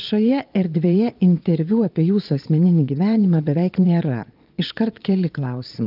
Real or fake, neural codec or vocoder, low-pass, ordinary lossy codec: fake; vocoder, 22.05 kHz, 80 mel bands, Vocos; 5.4 kHz; Opus, 24 kbps